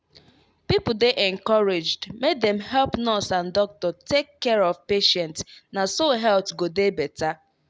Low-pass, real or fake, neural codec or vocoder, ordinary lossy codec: none; real; none; none